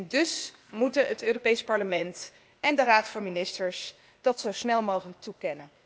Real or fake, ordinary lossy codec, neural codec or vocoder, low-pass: fake; none; codec, 16 kHz, 0.8 kbps, ZipCodec; none